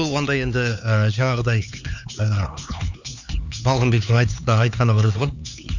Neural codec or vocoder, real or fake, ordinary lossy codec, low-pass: codec, 16 kHz, 4 kbps, X-Codec, HuBERT features, trained on LibriSpeech; fake; none; 7.2 kHz